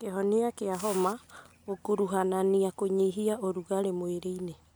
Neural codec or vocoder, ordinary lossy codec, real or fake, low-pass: none; none; real; none